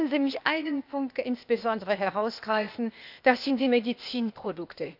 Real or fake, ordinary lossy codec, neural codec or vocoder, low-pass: fake; none; codec, 16 kHz, 0.8 kbps, ZipCodec; 5.4 kHz